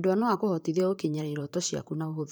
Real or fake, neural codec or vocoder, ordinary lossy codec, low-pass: fake; vocoder, 44.1 kHz, 128 mel bands, Pupu-Vocoder; none; none